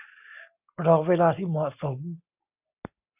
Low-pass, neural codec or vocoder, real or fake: 3.6 kHz; none; real